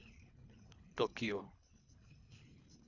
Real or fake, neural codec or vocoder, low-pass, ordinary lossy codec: fake; codec, 24 kHz, 3 kbps, HILCodec; 7.2 kHz; none